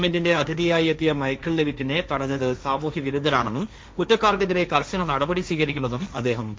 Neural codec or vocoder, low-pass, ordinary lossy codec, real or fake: codec, 16 kHz, 1.1 kbps, Voila-Tokenizer; none; none; fake